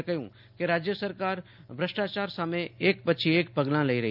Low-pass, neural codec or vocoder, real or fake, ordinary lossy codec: 5.4 kHz; none; real; none